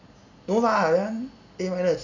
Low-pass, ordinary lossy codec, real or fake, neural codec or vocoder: 7.2 kHz; none; real; none